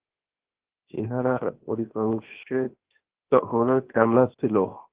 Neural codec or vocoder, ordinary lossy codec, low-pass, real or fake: codec, 16 kHz, 0.7 kbps, FocalCodec; Opus, 16 kbps; 3.6 kHz; fake